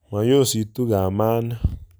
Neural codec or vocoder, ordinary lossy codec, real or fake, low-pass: none; none; real; none